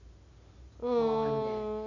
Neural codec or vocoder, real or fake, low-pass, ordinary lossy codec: none; real; 7.2 kHz; none